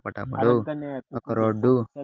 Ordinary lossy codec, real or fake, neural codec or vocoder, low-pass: Opus, 32 kbps; real; none; 7.2 kHz